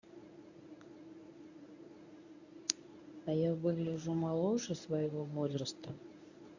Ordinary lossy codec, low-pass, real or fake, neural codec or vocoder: none; 7.2 kHz; fake; codec, 24 kHz, 0.9 kbps, WavTokenizer, medium speech release version 1